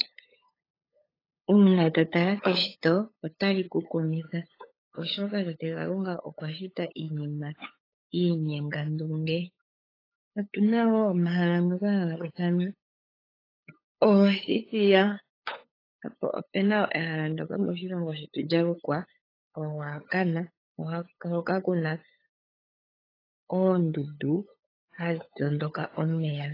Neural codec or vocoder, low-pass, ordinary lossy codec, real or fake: codec, 16 kHz, 8 kbps, FunCodec, trained on LibriTTS, 25 frames a second; 5.4 kHz; AAC, 24 kbps; fake